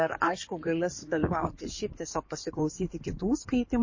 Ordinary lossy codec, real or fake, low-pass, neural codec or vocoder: MP3, 32 kbps; fake; 7.2 kHz; codec, 16 kHz in and 24 kHz out, 2.2 kbps, FireRedTTS-2 codec